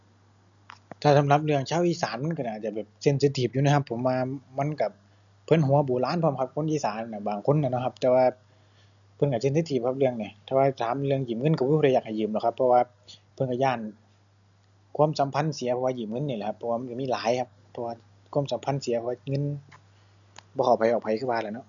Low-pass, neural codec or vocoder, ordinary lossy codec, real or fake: 7.2 kHz; none; none; real